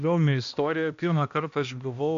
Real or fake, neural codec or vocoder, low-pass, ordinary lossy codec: fake; codec, 16 kHz, 1 kbps, X-Codec, HuBERT features, trained on balanced general audio; 7.2 kHz; AAC, 64 kbps